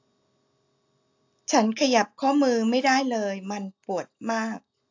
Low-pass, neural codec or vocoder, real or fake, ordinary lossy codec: 7.2 kHz; none; real; AAC, 48 kbps